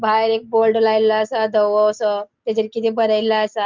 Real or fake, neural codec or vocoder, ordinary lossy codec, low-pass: real; none; Opus, 32 kbps; 7.2 kHz